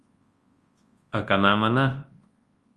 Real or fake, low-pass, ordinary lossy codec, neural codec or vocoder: fake; 10.8 kHz; Opus, 24 kbps; codec, 24 kHz, 0.9 kbps, WavTokenizer, large speech release